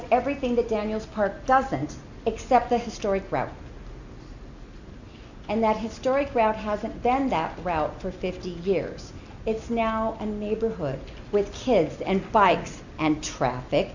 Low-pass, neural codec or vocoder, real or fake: 7.2 kHz; none; real